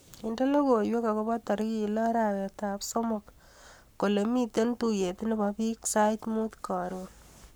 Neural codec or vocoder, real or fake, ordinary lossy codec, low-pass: codec, 44.1 kHz, 7.8 kbps, Pupu-Codec; fake; none; none